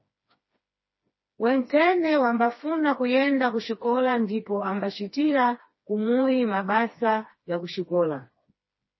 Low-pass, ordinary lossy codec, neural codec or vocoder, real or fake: 7.2 kHz; MP3, 24 kbps; codec, 16 kHz, 2 kbps, FreqCodec, smaller model; fake